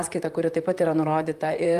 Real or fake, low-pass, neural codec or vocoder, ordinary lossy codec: fake; 14.4 kHz; vocoder, 44.1 kHz, 128 mel bands, Pupu-Vocoder; Opus, 32 kbps